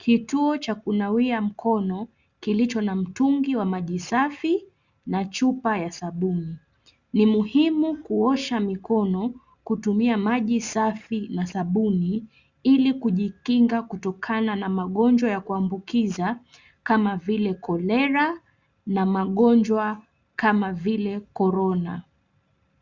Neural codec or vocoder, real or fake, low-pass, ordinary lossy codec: none; real; 7.2 kHz; Opus, 64 kbps